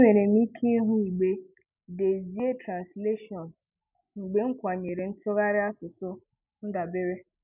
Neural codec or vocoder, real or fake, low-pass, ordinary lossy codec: none; real; 3.6 kHz; none